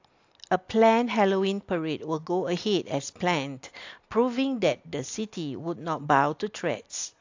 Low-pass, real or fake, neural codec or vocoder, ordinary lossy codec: 7.2 kHz; real; none; AAC, 48 kbps